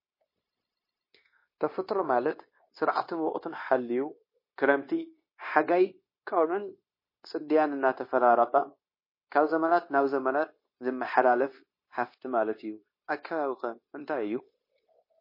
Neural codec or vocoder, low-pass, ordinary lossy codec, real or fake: codec, 16 kHz, 0.9 kbps, LongCat-Audio-Codec; 5.4 kHz; MP3, 24 kbps; fake